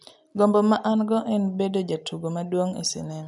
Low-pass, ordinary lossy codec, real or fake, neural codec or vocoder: 10.8 kHz; none; real; none